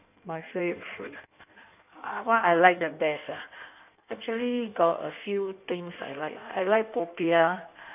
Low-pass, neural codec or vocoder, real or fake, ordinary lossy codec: 3.6 kHz; codec, 16 kHz in and 24 kHz out, 1.1 kbps, FireRedTTS-2 codec; fake; none